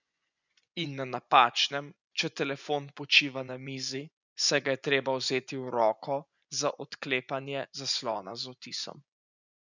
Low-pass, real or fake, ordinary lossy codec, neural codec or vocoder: 7.2 kHz; fake; none; vocoder, 24 kHz, 100 mel bands, Vocos